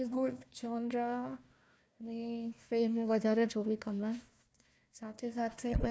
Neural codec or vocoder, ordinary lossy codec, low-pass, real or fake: codec, 16 kHz, 1 kbps, FunCodec, trained on Chinese and English, 50 frames a second; none; none; fake